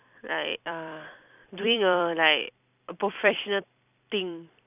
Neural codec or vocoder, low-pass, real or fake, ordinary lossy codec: vocoder, 44.1 kHz, 128 mel bands every 256 samples, BigVGAN v2; 3.6 kHz; fake; none